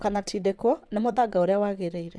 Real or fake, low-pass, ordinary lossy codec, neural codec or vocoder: fake; none; none; vocoder, 22.05 kHz, 80 mel bands, WaveNeXt